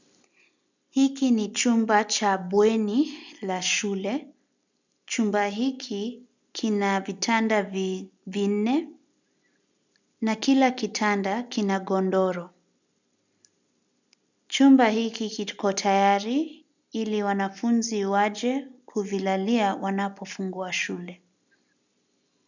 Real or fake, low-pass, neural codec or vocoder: real; 7.2 kHz; none